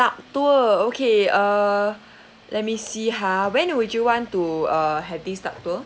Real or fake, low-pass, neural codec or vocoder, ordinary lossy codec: real; none; none; none